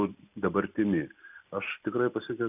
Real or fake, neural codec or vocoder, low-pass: real; none; 3.6 kHz